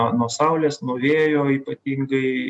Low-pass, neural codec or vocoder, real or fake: 10.8 kHz; none; real